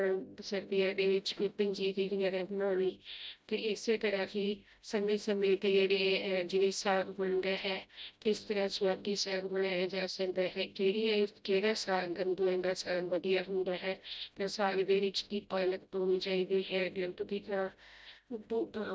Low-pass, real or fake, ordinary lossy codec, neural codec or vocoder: none; fake; none; codec, 16 kHz, 0.5 kbps, FreqCodec, smaller model